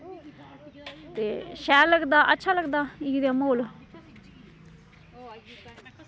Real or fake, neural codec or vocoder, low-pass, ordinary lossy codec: real; none; none; none